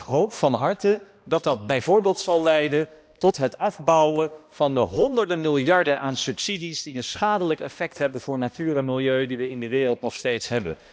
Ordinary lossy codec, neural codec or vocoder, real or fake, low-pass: none; codec, 16 kHz, 1 kbps, X-Codec, HuBERT features, trained on balanced general audio; fake; none